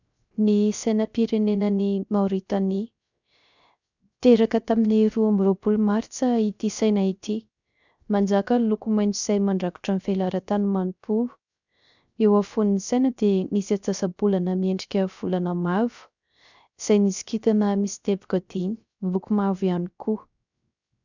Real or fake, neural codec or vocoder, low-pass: fake; codec, 16 kHz, 0.3 kbps, FocalCodec; 7.2 kHz